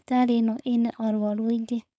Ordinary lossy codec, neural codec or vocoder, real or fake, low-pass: none; codec, 16 kHz, 4.8 kbps, FACodec; fake; none